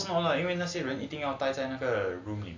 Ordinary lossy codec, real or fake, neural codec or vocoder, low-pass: AAC, 48 kbps; real; none; 7.2 kHz